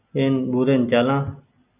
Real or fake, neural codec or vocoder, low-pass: real; none; 3.6 kHz